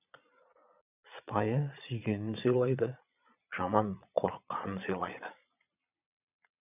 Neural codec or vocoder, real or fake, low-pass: vocoder, 22.05 kHz, 80 mel bands, Vocos; fake; 3.6 kHz